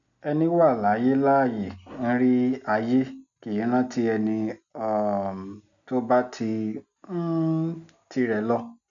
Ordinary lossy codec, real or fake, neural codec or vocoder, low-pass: none; real; none; 7.2 kHz